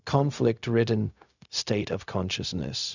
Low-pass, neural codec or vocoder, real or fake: 7.2 kHz; codec, 16 kHz, 0.4 kbps, LongCat-Audio-Codec; fake